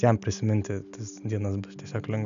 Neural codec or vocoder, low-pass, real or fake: none; 7.2 kHz; real